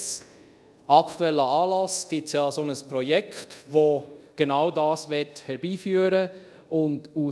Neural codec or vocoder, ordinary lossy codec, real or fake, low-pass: codec, 24 kHz, 0.5 kbps, DualCodec; none; fake; none